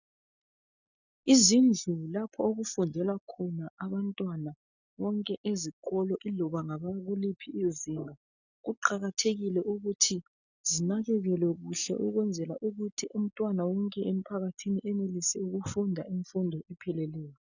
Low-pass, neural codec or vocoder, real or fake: 7.2 kHz; none; real